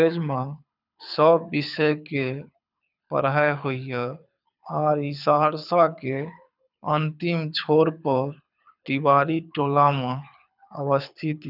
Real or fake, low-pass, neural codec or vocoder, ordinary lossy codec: fake; 5.4 kHz; codec, 24 kHz, 6 kbps, HILCodec; none